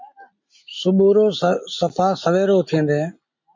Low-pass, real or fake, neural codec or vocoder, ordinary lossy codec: 7.2 kHz; real; none; MP3, 48 kbps